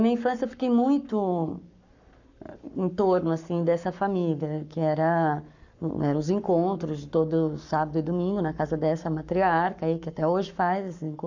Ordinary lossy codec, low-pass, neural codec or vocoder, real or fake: none; 7.2 kHz; codec, 44.1 kHz, 7.8 kbps, Pupu-Codec; fake